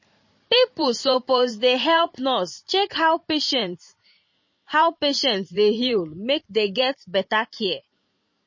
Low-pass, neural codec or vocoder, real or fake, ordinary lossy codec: 7.2 kHz; vocoder, 44.1 kHz, 128 mel bands every 512 samples, BigVGAN v2; fake; MP3, 32 kbps